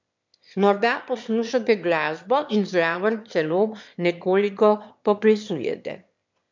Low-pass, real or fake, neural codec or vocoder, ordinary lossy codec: 7.2 kHz; fake; autoencoder, 22.05 kHz, a latent of 192 numbers a frame, VITS, trained on one speaker; MP3, 64 kbps